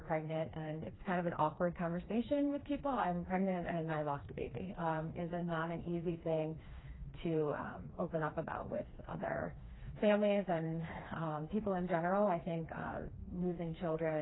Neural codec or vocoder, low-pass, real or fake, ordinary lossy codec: codec, 16 kHz, 2 kbps, FreqCodec, smaller model; 7.2 kHz; fake; AAC, 16 kbps